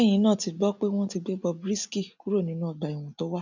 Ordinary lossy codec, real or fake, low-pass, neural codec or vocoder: none; real; 7.2 kHz; none